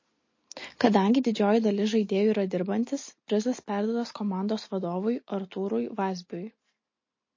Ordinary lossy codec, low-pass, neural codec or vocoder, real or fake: MP3, 32 kbps; 7.2 kHz; none; real